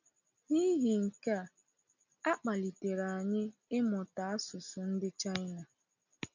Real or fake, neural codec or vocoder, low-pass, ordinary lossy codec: real; none; 7.2 kHz; none